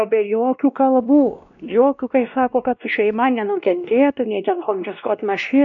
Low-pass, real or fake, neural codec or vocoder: 7.2 kHz; fake; codec, 16 kHz, 1 kbps, X-Codec, WavLM features, trained on Multilingual LibriSpeech